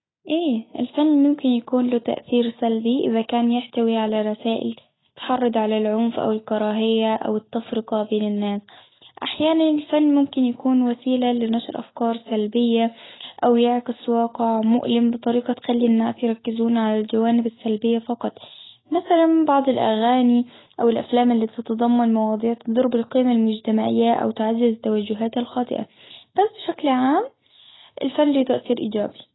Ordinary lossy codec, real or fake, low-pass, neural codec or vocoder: AAC, 16 kbps; real; 7.2 kHz; none